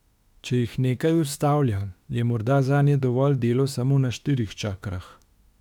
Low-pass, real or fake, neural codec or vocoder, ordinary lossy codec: 19.8 kHz; fake; autoencoder, 48 kHz, 32 numbers a frame, DAC-VAE, trained on Japanese speech; none